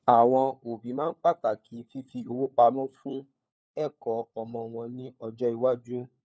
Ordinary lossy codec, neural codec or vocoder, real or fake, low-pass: none; codec, 16 kHz, 4 kbps, FunCodec, trained on LibriTTS, 50 frames a second; fake; none